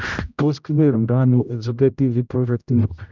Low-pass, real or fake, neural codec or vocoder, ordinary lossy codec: 7.2 kHz; fake; codec, 16 kHz, 0.5 kbps, X-Codec, HuBERT features, trained on general audio; none